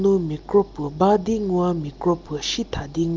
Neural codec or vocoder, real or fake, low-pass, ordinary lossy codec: none; real; 7.2 kHz; Opus, 32 kbps